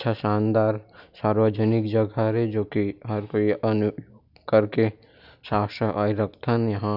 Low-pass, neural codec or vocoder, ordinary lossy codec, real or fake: 5.4 kHz; none; none; real